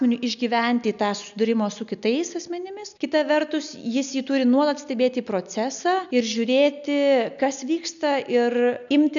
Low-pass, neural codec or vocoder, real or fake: 7.2 kHz; none; real